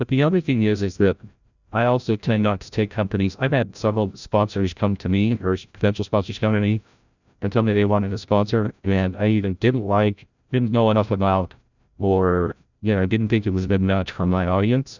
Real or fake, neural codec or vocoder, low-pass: fake; codec, 16 kHz, 0.5 kbps, FreqCodec, larger model; 7.2 kHz